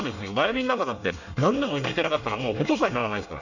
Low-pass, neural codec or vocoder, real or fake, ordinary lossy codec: 7.2 kHz; codec, 24 kHz, 1 kbps, SNAC; fake; none